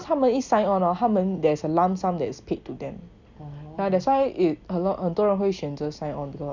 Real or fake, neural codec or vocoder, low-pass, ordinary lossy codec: real; none; 7.2 kHz; none